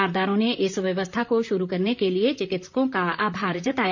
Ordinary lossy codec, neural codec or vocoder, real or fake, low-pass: MP3, 64 kbps; vocoder, 44.1 kHz, 128 mel bands, Pupu-Vocoder; fake; 7.2 kHz